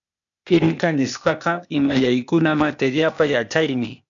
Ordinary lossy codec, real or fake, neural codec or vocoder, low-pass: MP3, 96 kbps; fake; codec, 16 kHz, 0.8 kbps, ZipCodec; 7.2 kHz